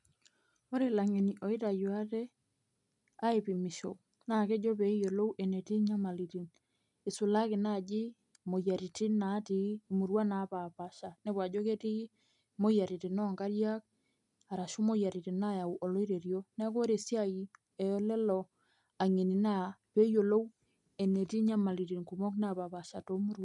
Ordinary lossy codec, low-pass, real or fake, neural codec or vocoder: none; 10.8 kHz; real; none